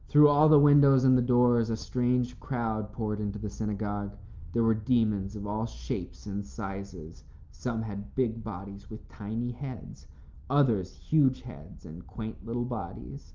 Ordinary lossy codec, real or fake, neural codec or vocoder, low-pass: Opus, 32 kbps; real; none; 7.2 kHz